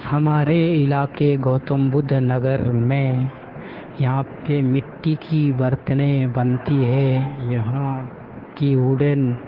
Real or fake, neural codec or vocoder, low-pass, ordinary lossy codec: fake; codec, 16 kHz, 2 kbps, FunCodec, trained on Chinese and English, 25 frames a second; 5.4 kHz; Opus, 16 kbps